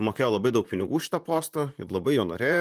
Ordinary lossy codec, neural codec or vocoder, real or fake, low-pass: Opus, 32 kbps; vocoder, 44.1 kHz, 128 mel bands, Pupu-Vocoder; fake; 14.4 kHz